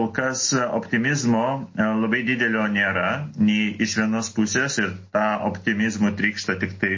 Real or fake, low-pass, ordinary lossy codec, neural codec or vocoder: real; 7.2 kHz; MP3, 32 kbps; none